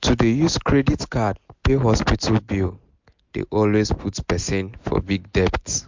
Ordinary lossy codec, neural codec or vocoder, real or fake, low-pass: MP3, 64 kbps; none; real; 7.2 kHz